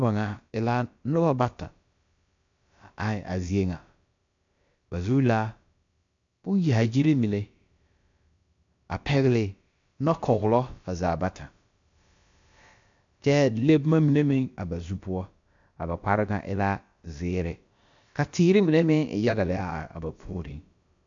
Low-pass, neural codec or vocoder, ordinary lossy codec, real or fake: 7.2 kHz; codec, 16 kHz, about 1 kbps, DyCAST, with the encoder's durations; MP3, 64 kbps; fake